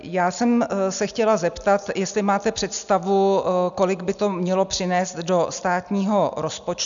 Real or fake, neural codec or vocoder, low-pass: real; none; 7.2 kHz